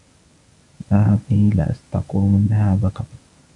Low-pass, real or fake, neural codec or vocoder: 10.8 kHz; fake; codec, 24 kHz, 0.9 kbps, WavTokenizer, medium speech release version 1